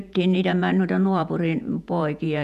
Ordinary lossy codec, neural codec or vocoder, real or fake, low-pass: none; vocoder, 48 kHz, 128 mel bands, Vocos; fake; 14.4 kHz